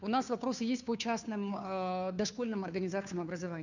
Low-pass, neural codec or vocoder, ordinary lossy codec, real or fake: 7.2 kHz; codec, 16 kHz, 2 kbps, FunCodec, trained on Chinese and English, 25 frames a second; none; fake